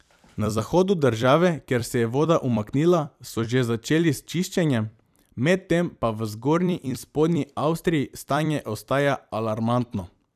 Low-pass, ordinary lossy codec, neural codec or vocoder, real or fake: 14.4 kHz; none; vocoder, 44.1 kHz, 128 mel bands every 256 samples, BigVGAN v2; fake